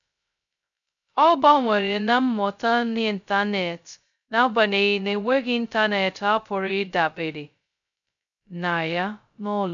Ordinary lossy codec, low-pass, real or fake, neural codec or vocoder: none; 7.2 kHz; fake; codec, 16 kHz, 0.2 kbps, FocalCodec